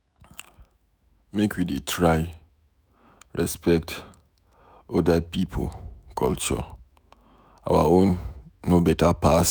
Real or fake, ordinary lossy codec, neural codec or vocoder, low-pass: fake; none; autoencoder, 48 kHz, 128 numbers a frame, DAC-VAE, trained on Japanese speech; none